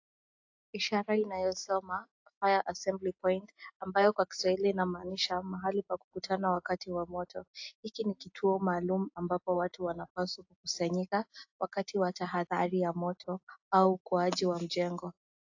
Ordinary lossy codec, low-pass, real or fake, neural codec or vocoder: AAC, 48 kbps; 7.2 kHz; real; none